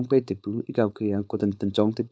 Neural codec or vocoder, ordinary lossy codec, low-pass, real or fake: codec, 16 kHz, 4.8 kbps, FACodec; none; none; fake